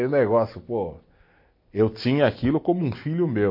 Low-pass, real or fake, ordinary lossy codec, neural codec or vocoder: 5.4 kHz; real; MP3, 32 kbps; none